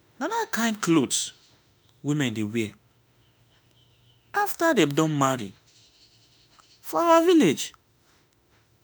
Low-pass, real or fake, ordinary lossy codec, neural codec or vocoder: none; fake; none; autoencoder, 48 kHz, 32 numbers a frame, DAC-VAE, trained on Japanese speech